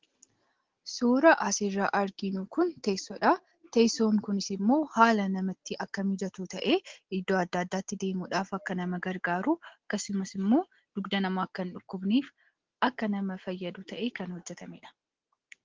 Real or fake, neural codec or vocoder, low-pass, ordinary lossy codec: real; none; 7.2 kHz; Opus, 16 kbps